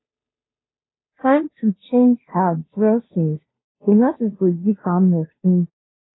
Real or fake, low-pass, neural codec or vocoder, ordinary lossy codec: fake; 7.2 kHz; codec, 16 kHz, 0.5 kbps, FunCodec, trained on Chinese and English, 25 frames a second; AAC, 16 kbps